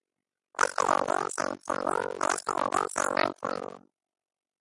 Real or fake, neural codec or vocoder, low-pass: real; none; 10.8 kHz